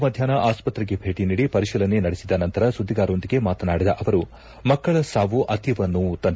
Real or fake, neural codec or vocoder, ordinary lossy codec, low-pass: real; none; none; none